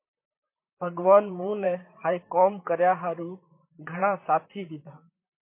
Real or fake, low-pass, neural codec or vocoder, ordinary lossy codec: fake; 3.6 kHz; vocoder, 44.1 kHz, 128 mel bands, Pupu-Vocoder; MP3, 24 kbps